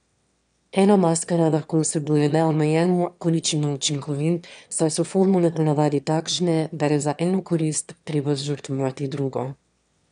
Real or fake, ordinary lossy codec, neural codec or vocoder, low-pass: fake; none; autoencoder, 22.05 kHz, a latent of 192 numbers a frame, VITS, trained on one speaker; 9.9 kHz